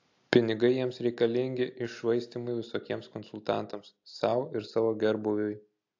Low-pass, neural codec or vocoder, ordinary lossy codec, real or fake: 7.2 kHz; none; AAC, 48 kbps; real